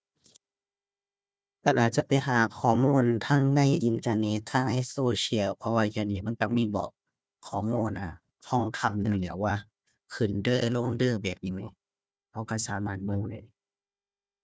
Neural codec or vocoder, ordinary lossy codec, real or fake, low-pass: codec, 16 kHz, 1 kbps, FunCodec, trained on Chinese and English, 50 frames a second; none; fake; none